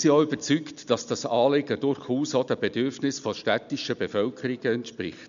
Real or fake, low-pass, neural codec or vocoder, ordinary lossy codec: real; 7.2 kHz; none; none